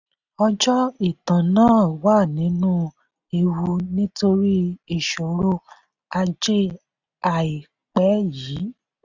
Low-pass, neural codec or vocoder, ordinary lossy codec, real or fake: 7.2 kHz; none; none; real